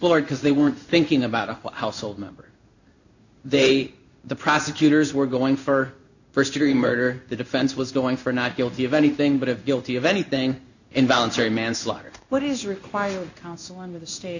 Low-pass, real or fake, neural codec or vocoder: 7.2 kHz; fake; codec, 16 kHz in and 24 kHz out, 1 kbps, XY-Tokenizer